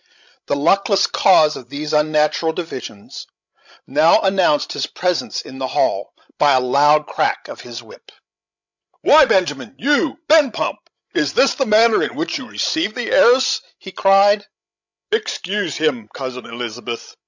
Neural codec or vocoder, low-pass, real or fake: codec, 16 kHz, 16 kbps, FreqCodec, larger model; 7.2 kHz; fake